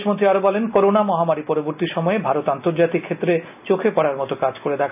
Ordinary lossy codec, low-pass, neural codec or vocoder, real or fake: none; 3.6 kHz; none; real